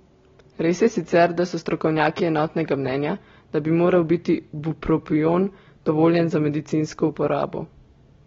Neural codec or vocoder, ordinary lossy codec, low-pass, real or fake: none; AAC, 24 kbps; 7.2 kHz; real